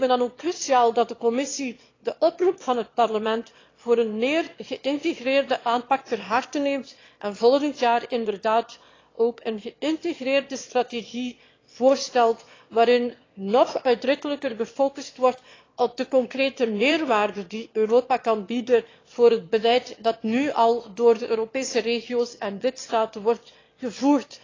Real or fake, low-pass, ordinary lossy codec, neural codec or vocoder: fake; 7.2 kHz; AAC, 32 kbps; autoencoder, 22.05 kHz, a latent of 192 numbers a frame, VITS, trained on one speaker